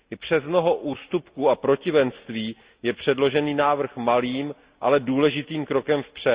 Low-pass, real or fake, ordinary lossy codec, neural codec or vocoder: 3.6 kHz; real; Opus, 64 kbps; none